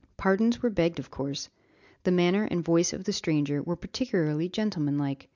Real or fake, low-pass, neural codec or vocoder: real; 7.2 kHz; none